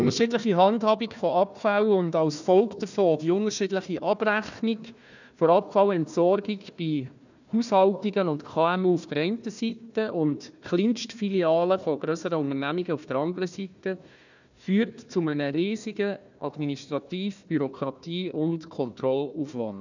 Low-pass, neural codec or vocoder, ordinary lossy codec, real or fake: 7.2 kHz; codec, 16 kHz, 1 kbps, FunCodec, trained on Chinese and English, 50 frames a second; none; fake